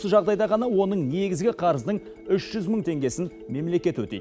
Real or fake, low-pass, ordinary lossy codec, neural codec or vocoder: real; none; none; none